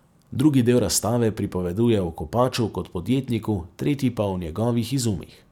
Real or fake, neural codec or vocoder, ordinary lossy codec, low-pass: fake; vocoder, 44.1 kHz, 128 mel bands every 256 samples, BigVGAN v2; none; 19.8 kHz